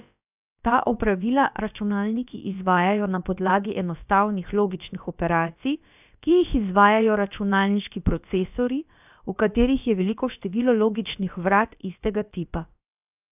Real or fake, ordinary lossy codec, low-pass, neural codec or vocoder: fake; none; 3.6 kHz; codec, 16 kHz, about 1 kbps, DyCAST, with the encoder's durations